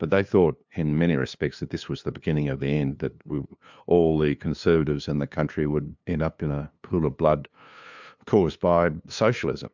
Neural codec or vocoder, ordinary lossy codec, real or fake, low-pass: codec, 16 kHz, 2 kbps, FunCodec, trained on LibriTTS, 25 frames a second; MP3, 64 kbps; fake; 7.2 kHz